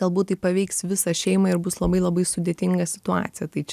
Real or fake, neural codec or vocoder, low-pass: real; none; 14.4 kHz